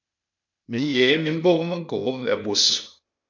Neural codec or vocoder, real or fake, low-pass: codec, 16 kHz, 0.8 kbps, ZipCodec; fake; 7.2 kHz